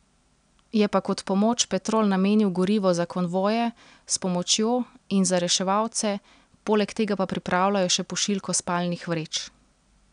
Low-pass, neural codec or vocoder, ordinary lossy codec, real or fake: 9.9 kHz; none; none; real